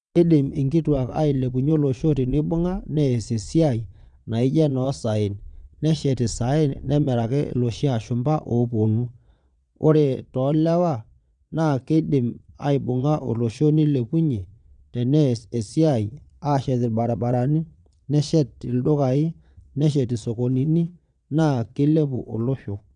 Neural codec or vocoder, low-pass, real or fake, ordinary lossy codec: vocoder, 22.05 kHz, 80 mel bands, Vocos; 9.9 kHz; fake; none